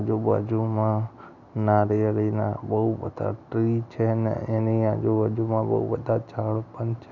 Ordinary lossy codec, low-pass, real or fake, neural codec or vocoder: none; 7.2 kHz; real; none